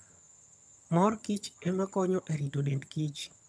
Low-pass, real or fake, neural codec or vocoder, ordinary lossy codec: none; fake; vocoder, 22.05 kHz, 80 mel bands, HiFi-GAN; none